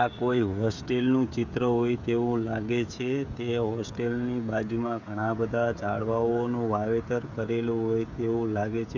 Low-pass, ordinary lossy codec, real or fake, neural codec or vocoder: 7.2 kHz; none; fake; codec, 16 kHz, 16 kbps, FreqCodec, smaller model